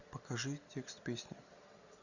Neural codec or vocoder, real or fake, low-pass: none; real; 7.2 kHz